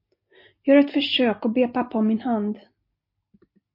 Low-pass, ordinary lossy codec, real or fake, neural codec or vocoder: 5.4 kHz; MP3, 32 kbps; real; none